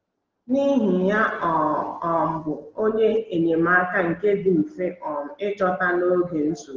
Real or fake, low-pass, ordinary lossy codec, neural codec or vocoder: real; 7.2 kHz; Opus, 16 kbps; none